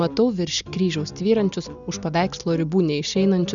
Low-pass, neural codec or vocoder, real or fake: 7.2 kHz; none; real